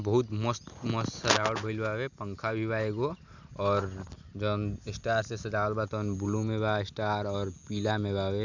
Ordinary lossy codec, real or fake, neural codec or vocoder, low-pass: none; real; none; 7.2 kHz